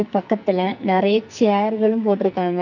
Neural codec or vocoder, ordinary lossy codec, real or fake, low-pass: codec, 44.1 kHz, 2.6 kbps, SNAC; none; fake; 7.2 kHz